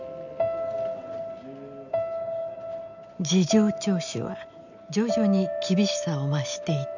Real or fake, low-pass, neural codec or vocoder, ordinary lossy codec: real; 7.2 kHz; none; none